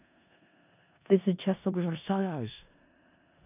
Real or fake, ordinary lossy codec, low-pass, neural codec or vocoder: fake; none; 3.6 kHz; codec, 16 kHz in and 24 kHz out, 0.4 kbps, LongCat-Audio-Codec, four codebook decoder